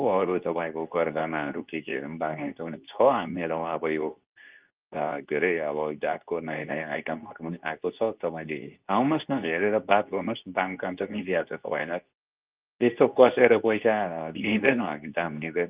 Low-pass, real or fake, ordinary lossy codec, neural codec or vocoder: 3.6 kHz; fake; Opus, 24 kbps; codec, 24 kHz, 0.9 kbps, WavTokenizer, medium speech release version 2